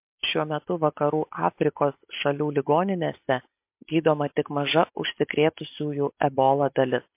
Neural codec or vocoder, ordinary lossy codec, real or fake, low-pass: none; MP3, 32 kbps; real; 3.6 kHz